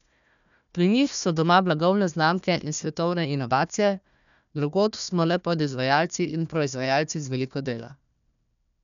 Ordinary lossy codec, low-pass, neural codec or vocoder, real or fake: none; 7.2 kHz; codec, 16 kHz, 1 kbps, FunCodec, trained on Chinese and English, 50 frames a second; fake